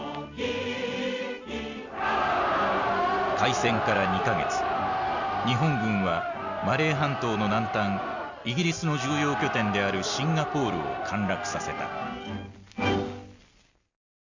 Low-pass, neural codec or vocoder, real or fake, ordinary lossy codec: 7.2 kHz; none; real; Opus, 64 kbps